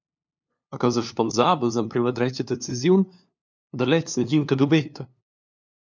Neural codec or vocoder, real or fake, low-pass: codec, 16 kHz, 2 kbps, FunCodec, trained on LibriTTS, 25 frames a second; fake; 7.2 kHz